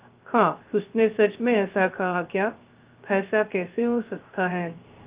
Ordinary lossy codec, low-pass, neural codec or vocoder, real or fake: Opus, 32 kbps; 3.6 kHz; codec, 16 kHz, 0.3 kbps, FocalCodec; fake